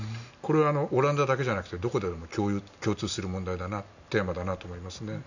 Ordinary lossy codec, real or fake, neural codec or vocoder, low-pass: none; real; none; 7.2 kHz